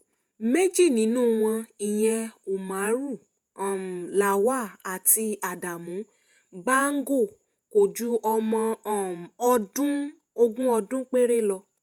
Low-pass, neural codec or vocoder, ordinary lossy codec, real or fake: none; vocoder, 48 kHz, 128 mel bands, Vocos; none; fake